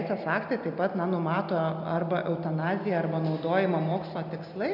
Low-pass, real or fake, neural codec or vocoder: 5.4 kHz; real; none